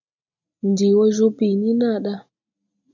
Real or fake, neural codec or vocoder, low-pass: real; none; 7.2 kHz